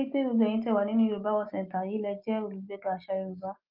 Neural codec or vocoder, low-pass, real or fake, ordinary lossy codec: none; 5.4 kHz; real; Opus, 32 kbps